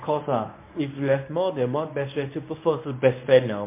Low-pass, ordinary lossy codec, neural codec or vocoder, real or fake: 3.6 kHz; MP3, 24 kbps; codec, 24 kHz, 0.9 kbps, WavTokenizer, medium speech release version 1; fake